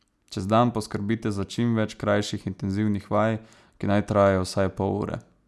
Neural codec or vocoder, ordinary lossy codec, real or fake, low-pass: none; none; real; none